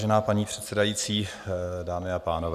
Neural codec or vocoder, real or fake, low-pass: none; real; 14.4 kHz